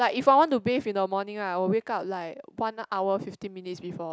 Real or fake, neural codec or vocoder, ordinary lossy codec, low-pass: real; none; none; none